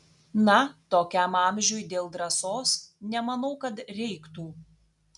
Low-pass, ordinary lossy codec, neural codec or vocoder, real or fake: 10.8 kHz; MP3, 96 kbps; none; real